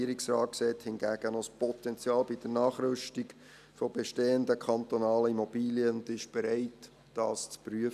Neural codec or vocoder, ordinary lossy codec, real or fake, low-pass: none; none; real; 14.4 kHz